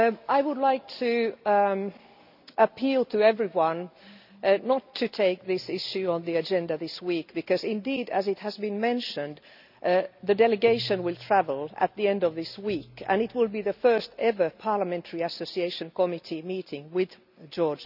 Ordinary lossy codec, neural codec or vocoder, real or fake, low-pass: none; none; real; 5.4 kHz